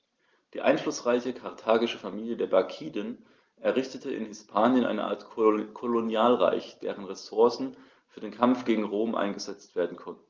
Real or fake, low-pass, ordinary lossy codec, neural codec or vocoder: real; 7.2 kHz; Opus, 32 kbps; none